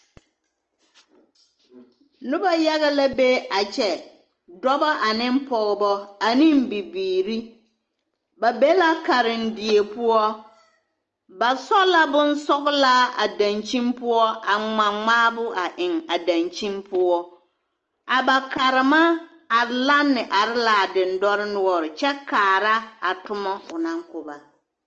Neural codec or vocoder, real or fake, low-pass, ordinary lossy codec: none; real; 7.2 kHz; Opus, 24 kbps